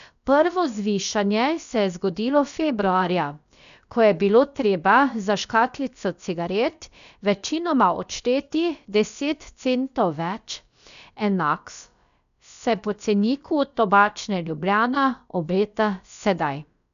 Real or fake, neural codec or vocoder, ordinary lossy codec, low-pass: fake; codec, 16 kHz, about 1 kbps, DyCAST, with the encoder's durations; none; 7.2 kHz